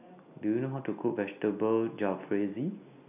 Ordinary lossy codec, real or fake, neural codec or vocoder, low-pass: none; real; none; 3.6 kHz